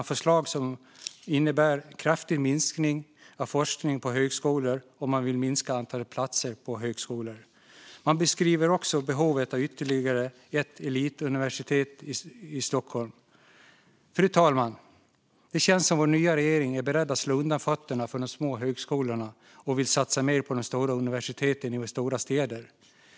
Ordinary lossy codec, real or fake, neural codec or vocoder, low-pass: none; real; none; none